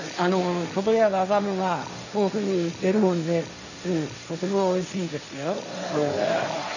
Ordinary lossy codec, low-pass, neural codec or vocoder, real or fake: none; 7.2 kHz; codec, 16 kHz, 1.1 kbps, Voila-Tokenizer; fake